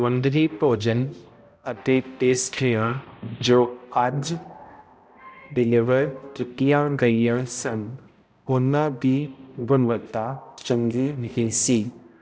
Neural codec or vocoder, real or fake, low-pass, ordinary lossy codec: codec, 16 kHz, 0.5 kbps, X-Codec, HuBERT features, trained on balanced general audio; fake; none; none